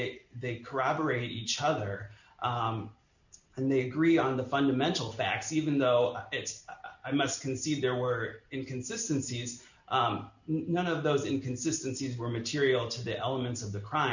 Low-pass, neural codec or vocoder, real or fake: 7.2 kHz; none; real